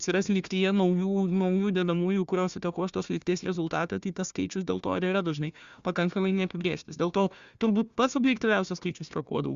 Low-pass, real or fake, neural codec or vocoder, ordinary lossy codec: 7.2 kHz; fake; codec, 16 kHz, 1 kbps, FunCodec, trained on Chinese and English, 50 frames a second; Opus, 64 kbps